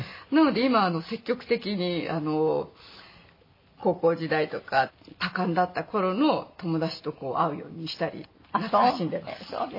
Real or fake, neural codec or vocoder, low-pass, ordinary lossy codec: real; none; 5.4 kHz; MP3, 24 kbps